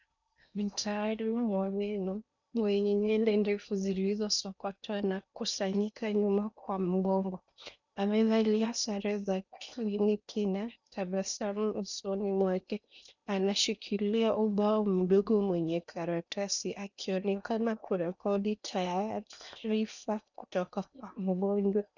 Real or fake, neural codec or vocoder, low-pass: fake; codec, 16 kHz in and 24 kHz out, 0.8 kbps, FocalCodec, streaming, 65536 codes; 7.2 kHz